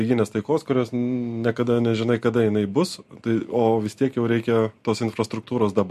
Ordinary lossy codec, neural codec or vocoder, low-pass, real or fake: MP3, 64 kbps; none; 14.4 kHz; real